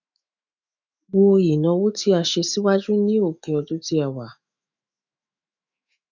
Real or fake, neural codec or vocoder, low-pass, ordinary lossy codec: fake; autoencoder, 48 kHz, 128 numbers a frame, DAC-VAE, trained on Japanese speech; 7.2 kHz; none